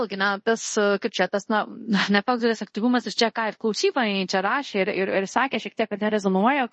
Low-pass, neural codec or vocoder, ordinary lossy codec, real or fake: 10.8 kHz; codec, 24 kHz, 0.5 kbps, DualCodec; MP3, 32 kbps; fake